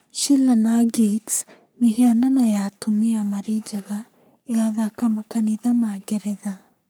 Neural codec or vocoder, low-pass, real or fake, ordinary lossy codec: codec, 44.1 kHz, 3.4 kbps, Pupu-Codec; none; fake; none